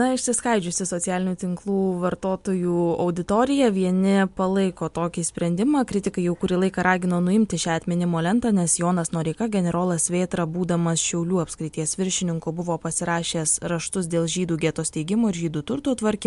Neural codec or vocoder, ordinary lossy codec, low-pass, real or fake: none; MP3, 64 kbps; 10.8 kHz; real